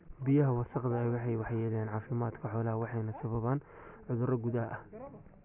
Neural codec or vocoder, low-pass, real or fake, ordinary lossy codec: none; 3.6 kHz; real; none